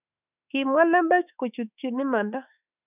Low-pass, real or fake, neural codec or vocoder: 3.6 kHz; fake; autoencoder, 48 kHz, 32 numbers a frame, DAC-VAE, trained on Japanese speech